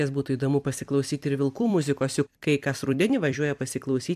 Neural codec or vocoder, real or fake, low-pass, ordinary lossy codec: vocoder, 44.1 kHz, 128 mel bands every 256 samples, BigVGAN v2; fake; 14.4 kHz; AAC, 96 kbps